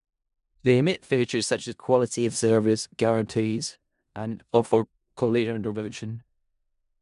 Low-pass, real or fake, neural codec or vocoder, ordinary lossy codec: 10.8 kHz; fake; codec, 16 kHz in and 24 kHz out, 0.4 kbps, LongCat-Audio-Codec, four codebook decoder; MP3, 96 kbps